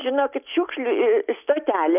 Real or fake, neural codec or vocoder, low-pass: real; none; 3.6 kHz